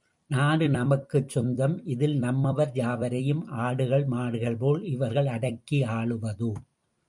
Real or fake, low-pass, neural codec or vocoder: fake; 10.8 kHz; vocoder, 44.1 kHz, 128 mel bands every 256 samples, BigVGAN v2